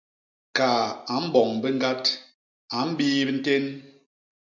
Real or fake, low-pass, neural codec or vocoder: real; 7.2 kHz; none